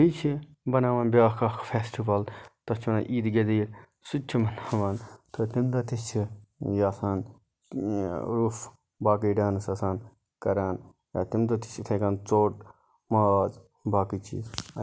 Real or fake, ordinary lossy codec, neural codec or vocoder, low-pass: real; none; none; none